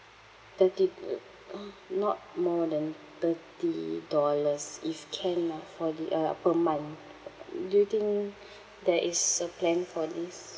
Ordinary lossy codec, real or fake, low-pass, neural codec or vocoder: none; real; none; none